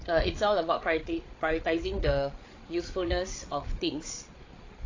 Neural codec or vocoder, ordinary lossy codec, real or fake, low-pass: codec, 16 kHz, 8 kbps, FreqCodec, larger model; AAC, 32 kbps; fake; 7.2 kHz